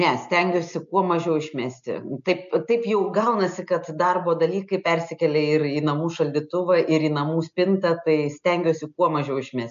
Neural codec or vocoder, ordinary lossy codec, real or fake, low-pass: none; MP3, 64 kbps; real; 7.2 kHz